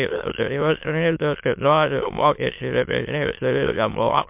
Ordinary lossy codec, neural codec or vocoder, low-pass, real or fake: MP3, 32 kbps; autoencoder, 22.05 kHz, a latent of 192 numbers a frame, VITS, trained on many speakers; 3.6 kHz; fake